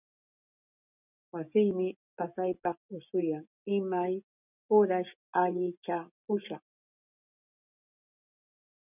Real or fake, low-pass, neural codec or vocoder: real; 3.6 kHz; none